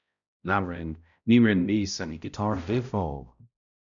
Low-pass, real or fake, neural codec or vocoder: 7.2 kHz; fake; codec, 16 kHz, 0.5 kbps, X-Codec, HuBERT features, trained on balanced general audio